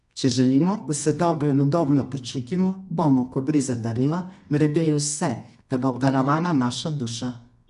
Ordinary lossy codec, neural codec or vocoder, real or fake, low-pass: MP3, 96 kbps; codec, 24 kHz, 0.9 kbps, WavTokenizer, medium music audio release; fake; 10.8 kHz